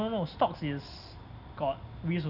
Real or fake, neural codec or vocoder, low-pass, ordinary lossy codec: real; none; 5.4 kHz; none